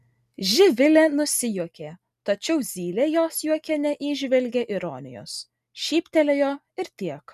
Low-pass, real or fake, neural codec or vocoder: 14.4 kHz; real; none